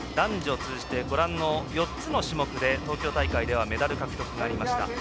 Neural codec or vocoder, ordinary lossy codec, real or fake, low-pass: none; none; real; none